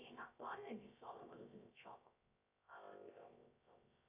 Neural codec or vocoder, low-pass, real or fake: codec, 16 kHz, 0.3 kbps, FocalCodec; 3.6 kHz; fake